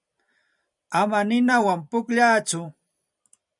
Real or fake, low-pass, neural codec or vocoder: fake; 10.8 kHz; vocoder, 44.1 kHz, 128 mel bands every 256 samples, BigVGAN v2